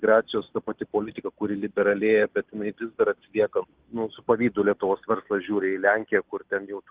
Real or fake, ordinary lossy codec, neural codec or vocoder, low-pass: real; Opus, 16 kbps; none; 3.6 kHz